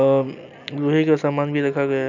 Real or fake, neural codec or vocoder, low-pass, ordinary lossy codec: real; none; 7.2 kHz; none